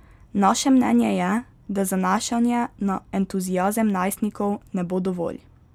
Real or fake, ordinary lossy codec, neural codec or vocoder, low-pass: real; none; none; 19.8 kHz